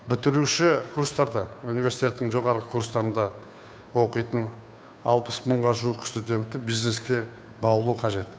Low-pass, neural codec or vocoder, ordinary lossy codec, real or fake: none; codec, 16 kHz, 2 kbps, FunCodec, trained on Chinese and English, 25 frames a second; none; fake